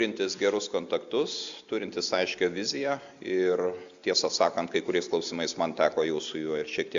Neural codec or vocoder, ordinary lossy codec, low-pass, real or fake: none; Opus, 64 kbps; 7.2 kHz; real